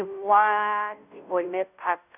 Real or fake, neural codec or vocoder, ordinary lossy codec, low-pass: fake; codec, 16 kHz, 0.5 kbps, FunCodec, trained on Chinese and English, 25 frames a second; none; 3.6 kHz